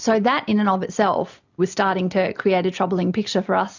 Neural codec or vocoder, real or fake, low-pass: none; real; 7.2 kHz